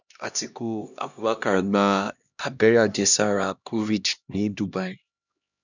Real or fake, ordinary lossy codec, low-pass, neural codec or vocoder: fake; none; 7.2 kHz; codec, 16 kHz, 1 kbps, X-Codec, HuBERT features, trained on LibriSpeech